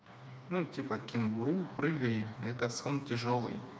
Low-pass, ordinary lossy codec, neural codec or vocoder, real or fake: none; none; codec, 16 kHz, 2 kbps, FreqCodec, smaller model; fake